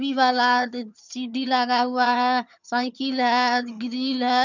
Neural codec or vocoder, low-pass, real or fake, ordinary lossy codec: vocoder, 22.05 kHz, 80 mel bands, HiFi-GAN; 7.2 kHz; fake; none